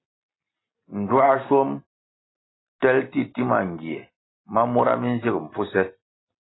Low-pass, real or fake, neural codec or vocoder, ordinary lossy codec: 7.2 kHz; real; none; AAC, 16 kbps